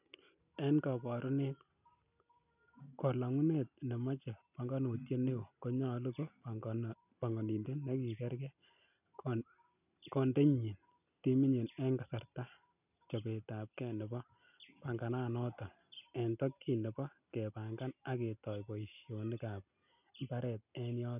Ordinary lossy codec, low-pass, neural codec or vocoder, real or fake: none; 3.6 kHz; none; real